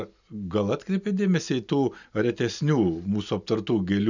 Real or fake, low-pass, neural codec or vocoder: real; 7.2 kHz; none